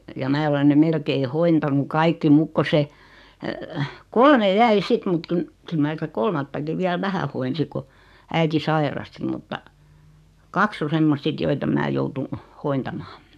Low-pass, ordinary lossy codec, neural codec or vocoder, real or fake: 14.4 kHz; none; codec, 44.1 kHz, 7.8 kbps, DAC; fake